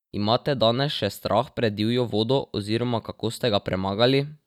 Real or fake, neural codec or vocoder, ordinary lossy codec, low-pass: real; none; none; 19.8 kHz